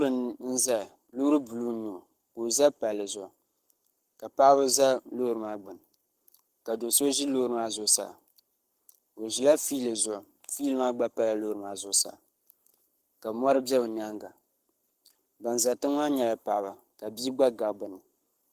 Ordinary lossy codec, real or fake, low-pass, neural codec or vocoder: Opus, 24 kbps; fake; 14.4 kHz; codec, 44.1 kHz, 7.8 kbps, Pupu-Codec